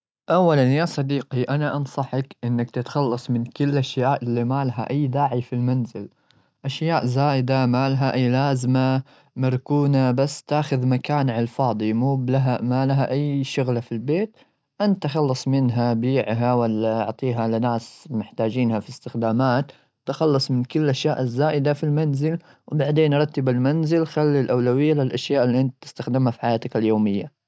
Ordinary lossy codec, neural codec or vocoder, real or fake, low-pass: none; none; real; none